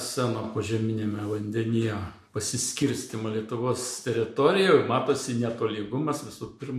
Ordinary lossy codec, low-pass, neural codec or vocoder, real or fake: MP3, 64 kbps; 14.4 kHz; none; real